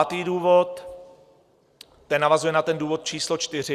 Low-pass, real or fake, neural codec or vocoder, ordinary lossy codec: 14.4 kHz; real; none; Opus, 64 kbps